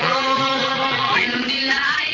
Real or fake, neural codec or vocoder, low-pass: fake; vocoder, 22.05 kHz, 80 mel bands, Vocos; 7.2 kHz